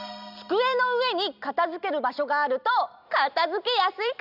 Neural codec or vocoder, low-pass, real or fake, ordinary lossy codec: none; 5.4 kHz; real; none